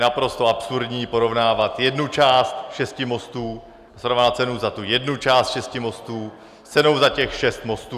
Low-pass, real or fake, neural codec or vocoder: 14.4 kHz; real; none